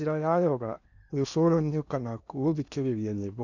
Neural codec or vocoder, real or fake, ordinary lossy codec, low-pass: codec, 16 kHz in and 24 kHz out, 0.8 kbps, FocalCodec, streaming, 65536 codes; fake; none; 7.2 kHz